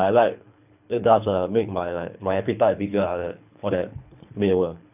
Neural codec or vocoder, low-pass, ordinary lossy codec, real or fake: codec, 24 kHz, 3 kbps, HILCodec; 3.6 kHz; none; fake